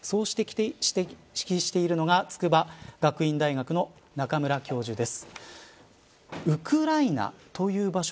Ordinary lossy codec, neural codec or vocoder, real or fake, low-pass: none; none; real; none